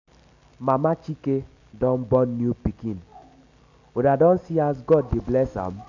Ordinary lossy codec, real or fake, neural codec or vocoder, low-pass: none; real; none; 7.2 kHz